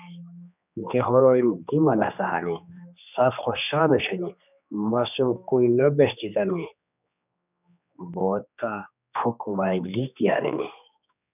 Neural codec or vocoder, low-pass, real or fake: codec, 16 kHz, 2 kbps, X-Codec, HuBERT features, trained on general audio; 3.6 kHz; fake